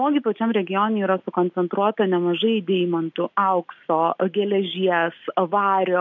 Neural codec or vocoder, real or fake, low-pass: none; real; 7.2 kHz